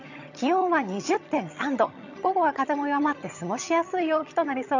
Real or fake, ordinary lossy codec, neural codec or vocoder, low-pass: fake; none; vocoder, 22.05 kHz, 80 mel bands, HiFi-GAN; 7.2 kHz